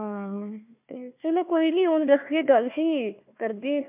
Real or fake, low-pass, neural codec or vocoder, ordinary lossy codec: fake; 3.6 kHz; codec, 16 kHz, 1 kbps, FunCodec, trained on Chinese and English, 50 frames a second; MP3, 32 kbps